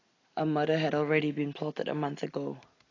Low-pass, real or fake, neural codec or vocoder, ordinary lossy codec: 7.2 kHz; real; none; AAC, 32 kbps